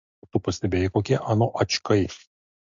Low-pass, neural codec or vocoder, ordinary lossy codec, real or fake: 7.2 kHz; none; MP3, 48 kbps; real